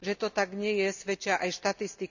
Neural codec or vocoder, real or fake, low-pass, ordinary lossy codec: none; real; 7.2 kHz; none